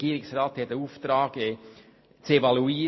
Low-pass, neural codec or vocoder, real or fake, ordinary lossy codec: 7.2 kHz; none; real; MP3, 24 kbps